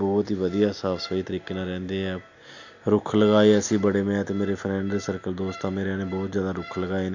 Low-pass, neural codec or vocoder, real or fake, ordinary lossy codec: 7.2 kHz; none; real; none